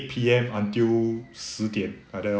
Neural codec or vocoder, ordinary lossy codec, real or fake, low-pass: none; none; real; none